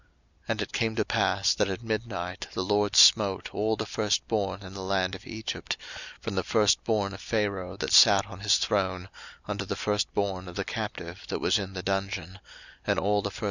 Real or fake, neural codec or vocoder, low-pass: real; none; 7.2 kHz